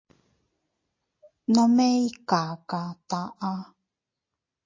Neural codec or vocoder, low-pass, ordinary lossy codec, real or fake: none; 7.2 kHz; MP3, 32 kbps; real